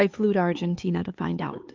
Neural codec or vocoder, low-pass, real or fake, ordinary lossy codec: codec, 16 kHz, 4 kbps, X-Codec, HuBERT features, trained on LibriSpeech; 7.2 kHz; fake; Opus, 24 kbps